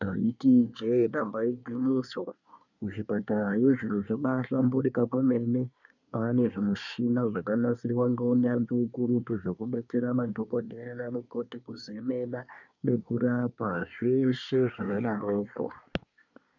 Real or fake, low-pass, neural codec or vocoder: fake; 7.2 kHz; codec, 24 kHz, 1 kbps, SNAC